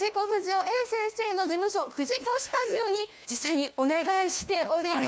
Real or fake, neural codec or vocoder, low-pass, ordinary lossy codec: fake; codec, 16 kHz, 1 kbps, FunCodec, trained on LibriTTS, 50 frames a second; none; none